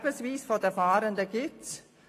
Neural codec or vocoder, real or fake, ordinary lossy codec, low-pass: none; real; AAC, 48 kbps; 14.4 kHz